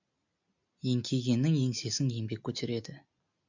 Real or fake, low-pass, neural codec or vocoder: real; 7.2 kHz; none